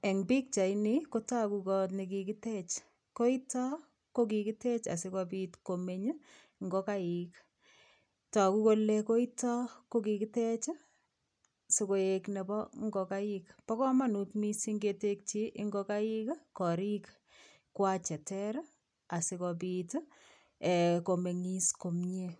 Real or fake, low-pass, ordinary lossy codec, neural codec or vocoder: real; 9.9 kHz; none; none